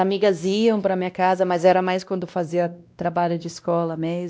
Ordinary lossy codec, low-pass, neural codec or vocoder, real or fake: none; none; codec, 16 kHz, 1 kbps, X-Codec, WavLM features, trained on Multilingual LibriSpeech; fake